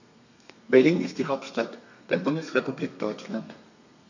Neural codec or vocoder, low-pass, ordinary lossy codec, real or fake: codec, 32 kHz, 1.9 kbps, SNAC; 7.2 kHz; none; fake